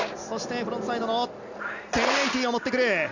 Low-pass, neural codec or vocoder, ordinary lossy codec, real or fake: 7.2 kHz; none; none; real